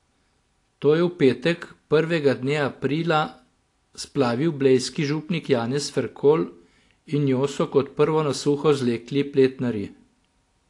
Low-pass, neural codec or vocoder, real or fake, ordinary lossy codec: 10.8 kHz; none; real; AAC, 48 kbps